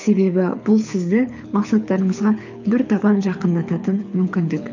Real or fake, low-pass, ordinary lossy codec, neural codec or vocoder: fake; 7.2 kHz; none; codec, 24 kHz, 6 kbps, HILCodec